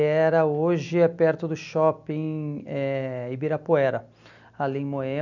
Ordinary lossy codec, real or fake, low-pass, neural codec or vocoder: none; real; 7.2 kHz; none